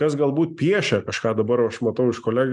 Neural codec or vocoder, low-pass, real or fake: none; 10.8 kHz; real